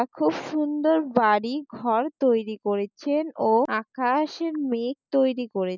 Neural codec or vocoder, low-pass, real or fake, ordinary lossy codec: none; 7.2 kHz; real; none